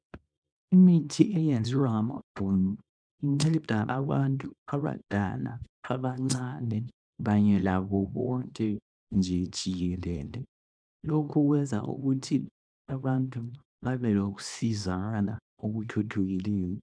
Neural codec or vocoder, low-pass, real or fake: codec, 24 kHz, 0.9 kbps, WavTokenizer, small release; 9.9 kHz; fake